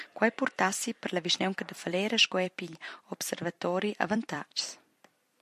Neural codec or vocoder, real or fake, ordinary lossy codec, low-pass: vocoder, 44.1 kHz, 128 mel bands every 256 samples, BigVGAN v2; fake; MP3, 64 kbps; 14.4 kHz